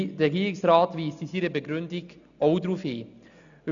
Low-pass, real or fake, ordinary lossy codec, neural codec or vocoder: 7.2 kHz; real; none; none